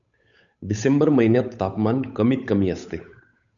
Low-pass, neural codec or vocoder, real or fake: 7.2 kHz; codec, 16 kHz, 8 kbps, FunCodec, trained on Chinese and English, 25 frames a second; fake